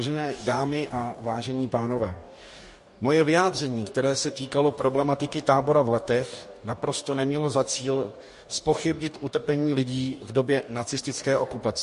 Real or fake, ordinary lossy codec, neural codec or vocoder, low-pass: fake; MP3, 48 kbps; codec, 44.1 kHz, 2.6 kbps, DAC; 14.4 kHz